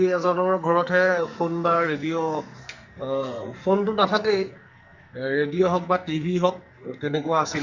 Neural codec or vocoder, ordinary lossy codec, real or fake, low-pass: codec, 44.1 kHz, 2.6 kbps, SNAC; Opus, 64 kbps; fake; 7.2 kHz